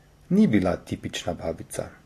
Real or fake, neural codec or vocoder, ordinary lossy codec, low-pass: real; none; AAC, 48 kbps; 14.4 kHz